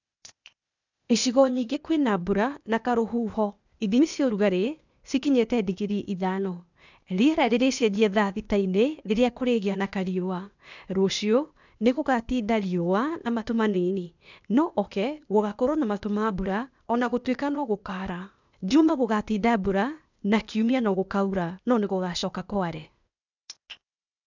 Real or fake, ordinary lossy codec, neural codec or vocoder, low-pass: fake; none; codec, 16 kHz, 0.8 kbps, ZipCodec; 7.2 kHz